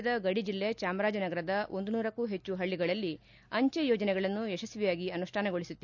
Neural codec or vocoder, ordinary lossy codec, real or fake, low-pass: none; none; real; 7.2 kHz